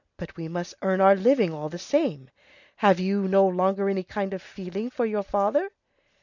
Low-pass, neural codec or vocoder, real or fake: 7.2 kHz; none; real